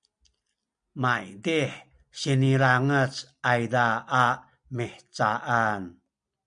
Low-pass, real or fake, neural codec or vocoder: 9.9 kHz; real; none